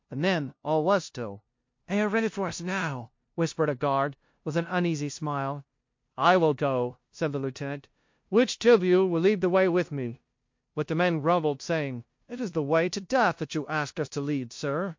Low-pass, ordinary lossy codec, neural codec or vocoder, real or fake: 7.2 kHz; MP3, 48 kbps; codec, 16 kHz, 0.5 kbps, FunCodec, trained on LibriTTS, 25 frames a second; fake